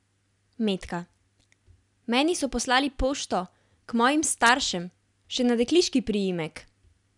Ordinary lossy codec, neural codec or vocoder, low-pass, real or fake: none; none; 10.8 kHz; real